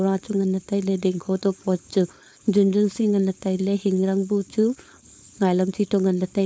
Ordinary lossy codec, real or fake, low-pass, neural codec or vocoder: none; fake; none; codec, 16 kHz, 4.8 kbps, FACodec